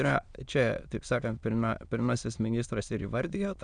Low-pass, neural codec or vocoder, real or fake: 9.9 kHz; autoencoder, 22.05 kHz, a latent of 192 numbers a frame, VITS, trained on many speakers; fake